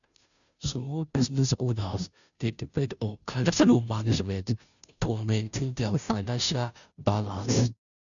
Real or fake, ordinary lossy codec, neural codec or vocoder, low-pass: fake; none; codec, 16 kHz, 0.5 kbps, FunCodec, trained on Chinese and English, 25 frames a second; 7.2 kHz